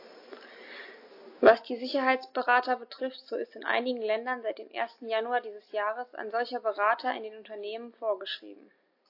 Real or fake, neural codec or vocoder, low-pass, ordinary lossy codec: real; none; 5.4 kHz; AAC, 32 kbps